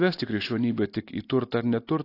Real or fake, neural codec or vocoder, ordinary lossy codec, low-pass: real; none; AAC, 32 kbps; 5.4 kHz